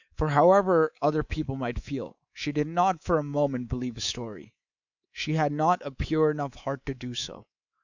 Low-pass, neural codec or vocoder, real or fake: 7.2 kHz; codec, 24 kHz, 3.1 kbps, DualCodec; fake